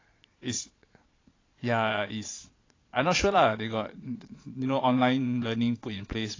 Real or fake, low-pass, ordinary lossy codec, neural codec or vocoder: fake; 7.2 kHz; AAC, 32 kbps; vocoder, 22.05 kHz, 80 mel bands, Vocos